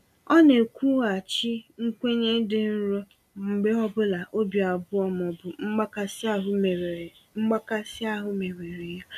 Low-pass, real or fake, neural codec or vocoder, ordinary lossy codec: 14.4 kHz; real; none; none